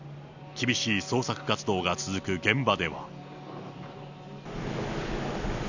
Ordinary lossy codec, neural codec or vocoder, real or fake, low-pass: none; none; real; 7.2 kHz